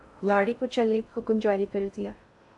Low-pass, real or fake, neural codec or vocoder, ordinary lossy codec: 10.8 kHz; fake; codec, 16 kHz in and 24 kHz out, 0.6 kbps, FocalCodec, streaming, 2048 codes; AAC, 64 kbps